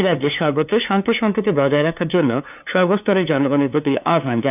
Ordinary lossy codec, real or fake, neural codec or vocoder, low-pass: none; fake; codec, 16 kHz, 2 kbps, FunCodec, trained on LibriTTS, 25 frames a second; 3.6 kHz